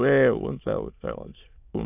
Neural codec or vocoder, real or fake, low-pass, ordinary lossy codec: autoencoder, 22.05 kHz, a latent of 192 numbers a frame, VITS, trained on many speakers; fake; 3.6 kHz; AAC, 32 kbps